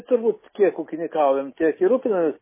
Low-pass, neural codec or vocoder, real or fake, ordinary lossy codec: 3.6 kHz; none; real; MP3, 16 kbps